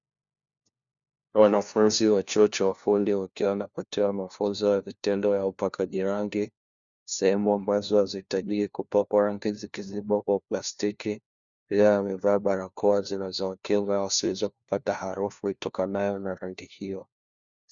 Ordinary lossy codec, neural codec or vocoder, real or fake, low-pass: Opus, 64 kbps; codec, 16 kHz, 1 kbps, FunCodec, trained on LibriTTS, 50 frames a second; fake; 7.2 kHz